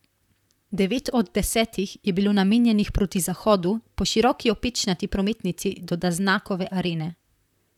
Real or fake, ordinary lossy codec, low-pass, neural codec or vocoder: fake; none; 19.8 kHz; vocoder, 44.1 kHz, 128 mel bands, Pupu-Vocoder